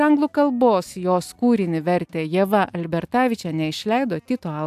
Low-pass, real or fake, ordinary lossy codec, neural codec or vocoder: 14.4 kHz; real; AAC, 96 kbps; none